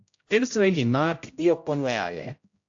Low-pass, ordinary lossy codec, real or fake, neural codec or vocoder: 7.2 kHz; AAC, 48 kbps; fake; codec, 16 kHz, 0.5 kbps, X-Codec, HuBERT features, trained on general audio